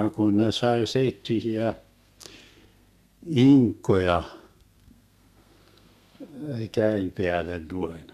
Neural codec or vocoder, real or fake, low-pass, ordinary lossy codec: codec, 32 kHz, 1.9 kbps, SNAC; fake; 14.4 kHz; none